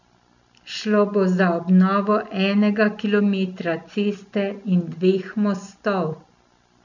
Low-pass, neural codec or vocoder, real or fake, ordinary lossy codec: 7.2 kHz; none; real; none